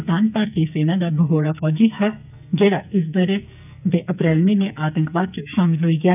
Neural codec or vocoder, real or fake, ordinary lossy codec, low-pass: codec, 44.1 kHz, 2.6 kbps, SNAC; fake; none; 3.6 kHz